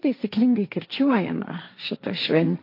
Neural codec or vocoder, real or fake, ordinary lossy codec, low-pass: codec, 16 kHz, 1.1 kbps, Voila-Tokenizer; fake; AAC, 32 kbps; 5.4 kHz